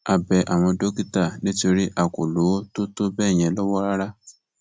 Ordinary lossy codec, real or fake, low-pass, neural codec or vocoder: none; real; none; none